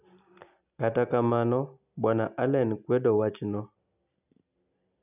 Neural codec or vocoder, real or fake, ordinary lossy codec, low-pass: none; real; none; 3.6 kHz